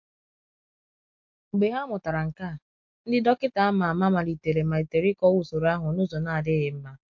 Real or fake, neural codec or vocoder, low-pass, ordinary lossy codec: real; none; 7.2 kHz; MP3, 48 kbps